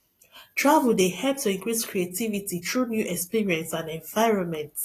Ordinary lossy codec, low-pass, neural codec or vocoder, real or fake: AAC, 48 kbps; 14.4 kHz; none; real